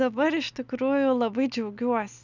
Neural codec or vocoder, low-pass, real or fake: none; 7.2 kHz; real